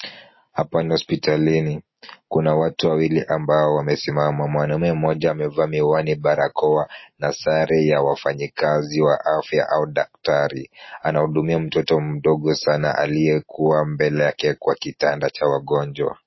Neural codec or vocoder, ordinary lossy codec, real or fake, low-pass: none; MP3, 24 kbps; real; 7.2 kHz